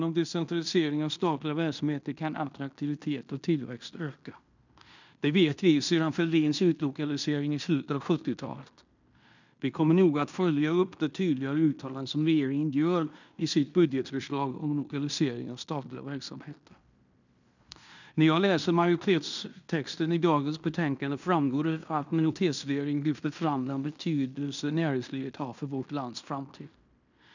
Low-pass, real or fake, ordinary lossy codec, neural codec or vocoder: 7.2 kHz; fake; none; codec, 16 kHz in and 24 kHz out, 0.9 kbps, LongCat-Audio-Codec, fine tuned four codebook decoder